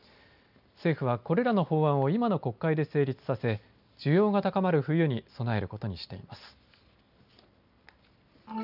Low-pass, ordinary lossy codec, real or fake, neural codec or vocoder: 5.4 kHz; none; real; none